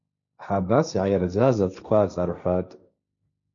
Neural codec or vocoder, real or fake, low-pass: codec, 16 kHz, 1.1 kbps, Voila-Tokenizer; fake; 7.2 kHz